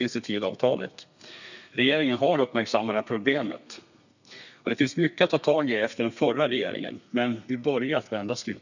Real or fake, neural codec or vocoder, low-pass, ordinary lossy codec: fake; codec, 32 kHz, 1.9 kbps, SNAC; 7.2 kHz; none